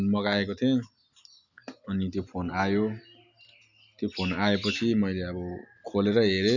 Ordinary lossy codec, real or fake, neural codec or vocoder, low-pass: none; real; none; 7.2 kHz